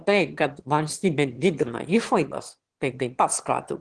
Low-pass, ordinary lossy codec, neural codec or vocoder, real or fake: 9.9 kHz; Opus, 16 kbps; autoencoder, 22.05 kHz, a latent of 192 numbers a frame, VITS, trained on one speaker; fake